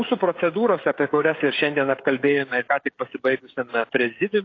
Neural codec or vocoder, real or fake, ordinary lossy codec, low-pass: codec, 16 kHz, 16 kbps, FreqCodec, smaller model; fake; AAC, 32 kbps; 7.2 kHz